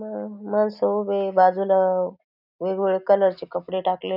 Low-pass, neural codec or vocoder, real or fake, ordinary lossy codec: 5.4 kHz; none; real; none